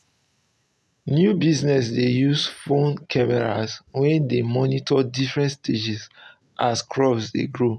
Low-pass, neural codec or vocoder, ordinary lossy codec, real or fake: none; none; none; real